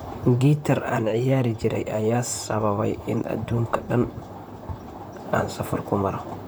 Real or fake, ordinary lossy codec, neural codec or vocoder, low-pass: fake; none; vocoder, 44.1 kHz, 128 mel bands, Pupu-Vocoder; none